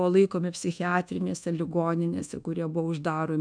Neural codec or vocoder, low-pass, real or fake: codec, 24 kHz, 1.2 kbps, DualCodec; 9.9 kHz; fake